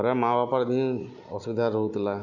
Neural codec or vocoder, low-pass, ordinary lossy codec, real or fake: none; 7.2 kHz; none; real